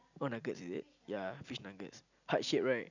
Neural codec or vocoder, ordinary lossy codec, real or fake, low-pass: none; none; real; 7.2 kHz